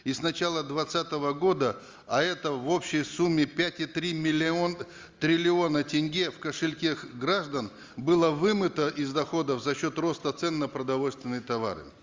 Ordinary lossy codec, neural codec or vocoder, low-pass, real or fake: Opus, 32 kbps; none; 7.2 kHz; real